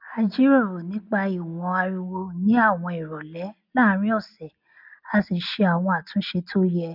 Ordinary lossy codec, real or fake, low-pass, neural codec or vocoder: none; real; 5.4 kHz; none